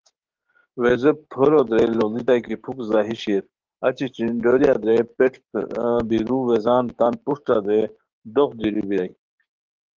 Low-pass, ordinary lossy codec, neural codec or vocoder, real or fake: 7.2 kHz; Opus, 16 kbps; codec, 16 kHz, 6 kbps, DAC; fake